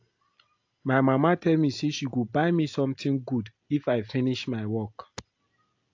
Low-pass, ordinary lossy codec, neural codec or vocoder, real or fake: 7.2 kHz; AAC, 48 kbps; none; real